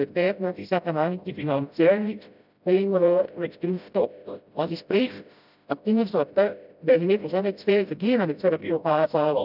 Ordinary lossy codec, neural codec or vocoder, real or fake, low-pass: none; codec, 16 kHz, 0.5 kbps, FreqCodec, smaller model; fake; 5.4 kHz